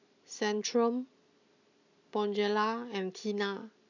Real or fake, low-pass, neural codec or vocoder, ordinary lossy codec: real; 7.2 kHz; none; none